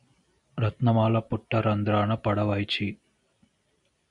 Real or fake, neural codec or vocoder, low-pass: real; none; 10.8 kHz